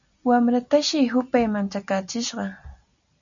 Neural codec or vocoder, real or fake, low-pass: none; real; 7.2 kHz